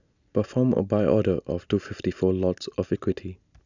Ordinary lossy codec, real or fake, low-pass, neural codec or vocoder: none; real; 7.2 kHz; none